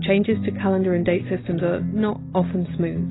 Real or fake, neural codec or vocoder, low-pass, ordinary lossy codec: real; none; 7.2 kHz; AAC, 16 kbps